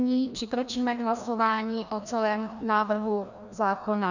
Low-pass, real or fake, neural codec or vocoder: 7.2 kHz; fake; codec, 16 kHz, 1 kbps, FreqCodec, larger model